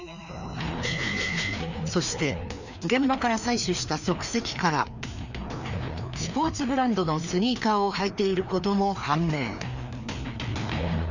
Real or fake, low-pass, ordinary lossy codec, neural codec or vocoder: fake; 7.2 kHz; none; codec, 16 kHz, 2 kbps, FreqCodec, larger model